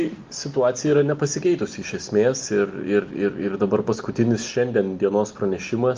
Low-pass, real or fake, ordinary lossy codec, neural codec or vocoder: 7.2 kHz; real; Opus, 24 kbps; none